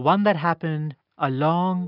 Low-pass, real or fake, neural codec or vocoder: 5.4 kHz; real; none